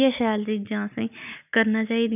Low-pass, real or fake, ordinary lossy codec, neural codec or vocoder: 3.6 kHz; real; none; none